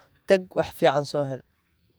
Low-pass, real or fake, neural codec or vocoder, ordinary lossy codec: none; fake; codec, 44.1 kHz, 2.6 kbps, SNAC; none